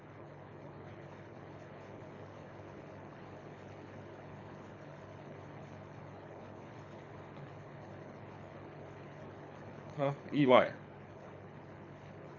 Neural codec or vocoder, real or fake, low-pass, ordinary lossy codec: codec, 24 kHz, 6 kbps, HILCodec; fake; 7.2 kHz; none